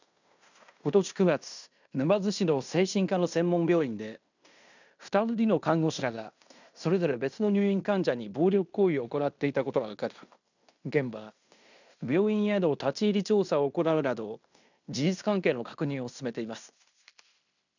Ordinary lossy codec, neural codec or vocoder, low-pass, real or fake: none; codec, 16 kHz in and 24 kHz out, 0.9 kbps, LongCat-Audio-Codec, fine tuned four codebook decoder; 7.2 kHz; fake